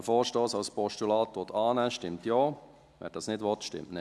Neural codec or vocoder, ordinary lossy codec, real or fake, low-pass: none; none; real; none